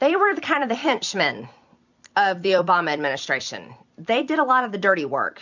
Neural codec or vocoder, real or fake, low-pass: vocoder, 44.1 kHz, 128 mel bands every 256 samples, BigVGAN v2; fake; 7.2 kHz